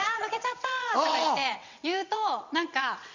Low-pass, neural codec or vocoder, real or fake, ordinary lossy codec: 7.2 kHz; vocoder, 22.05 kHz, 80 mel bands, WaveNeXt; fake; none